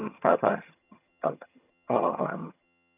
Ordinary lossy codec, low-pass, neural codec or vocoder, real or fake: none; 3.6 kHz; vocoder, 22.05 kHz, 80 mel bands, HiFi-GAN; fake